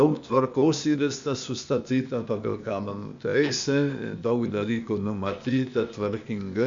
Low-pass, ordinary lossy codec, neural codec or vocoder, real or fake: 7.2 kHz; MP3, 64 kbps; codec, 16 kHz, 0.8 kbps, ZipCodec; fake